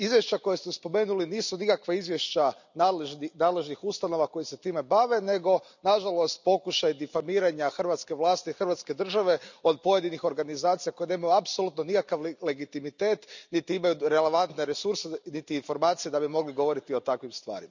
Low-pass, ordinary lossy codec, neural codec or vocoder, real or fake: 7.2 kHz; none; none; real